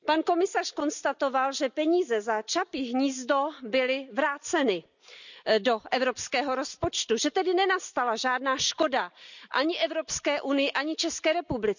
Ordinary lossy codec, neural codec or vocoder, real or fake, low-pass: none; none; real; 7.2 kHz